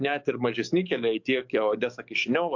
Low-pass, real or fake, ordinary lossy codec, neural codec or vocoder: 7.2 kHz; fake; MP3, 64 kbps; codec, 44.1 kHz, 7.8 kbps, DAC